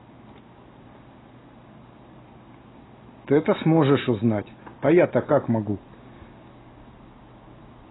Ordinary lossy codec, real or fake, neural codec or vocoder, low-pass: AAC, 16 kbps; real; none; 7.2 kHz